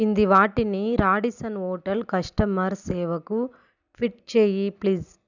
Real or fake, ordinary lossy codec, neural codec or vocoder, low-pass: real; none; none; 7.2 kHz